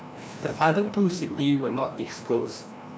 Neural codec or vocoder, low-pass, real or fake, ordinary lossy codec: codec, 16 kHz, 1 kbps, FreqCodec, larger model; none; fake; none